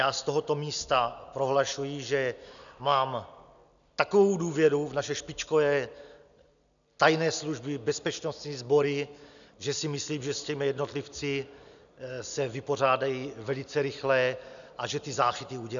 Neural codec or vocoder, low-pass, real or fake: none; 7.2 kHz; real